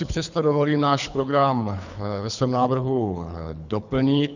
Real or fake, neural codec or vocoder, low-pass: fake; codec, 24 kHz, 3 kbps, HILCodec; 7.2 kHz